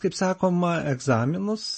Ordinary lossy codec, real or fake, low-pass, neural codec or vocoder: MP3, 32 kbps; real; 9.9 kHz; none